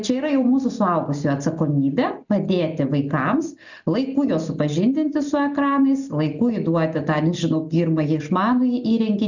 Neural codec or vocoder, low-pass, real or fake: none; 7.2 kHz; real